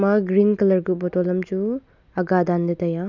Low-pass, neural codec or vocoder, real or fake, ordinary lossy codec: 7.2 kHz; none; real; none